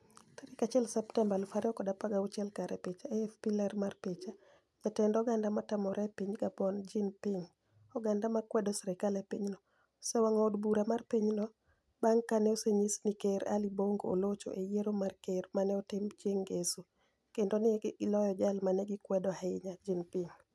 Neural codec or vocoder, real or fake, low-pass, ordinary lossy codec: none; real; none; none